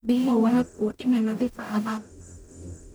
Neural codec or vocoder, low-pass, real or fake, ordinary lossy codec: codec, 44.1 kHz, 0.9 kbps, DAC; none; fake; none